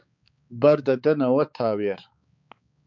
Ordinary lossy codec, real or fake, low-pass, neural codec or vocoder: MP3, 64 kbps; fake; 7.2 kHz; codec, 16 kHz, 4 kbps, X-Codec, HuBERT features, trained on balanced general audio